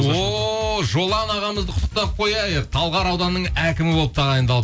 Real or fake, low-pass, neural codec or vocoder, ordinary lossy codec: real; none; none; none